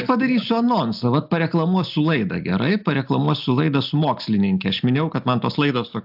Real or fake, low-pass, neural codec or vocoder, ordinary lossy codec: real; 5.4 kHz; none; AAC, 48 kbps